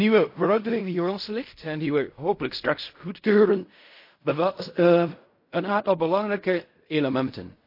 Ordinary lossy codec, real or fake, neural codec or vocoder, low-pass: MP3, 32 kbps; fake; codec, 16 kHz in and 24 kHz out, 0.4 kbps, LongCat-Audio-Codec, fine tuned four codebook decoder; 5.4 kHz